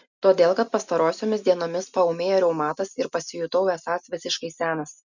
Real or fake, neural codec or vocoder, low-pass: real; none; 7.2 kHz